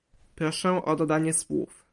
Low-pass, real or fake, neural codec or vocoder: 10.8 kHz; fake; vocoder, 44.1 kHz, 128 mel bands every 512 samples, BigVGAN v2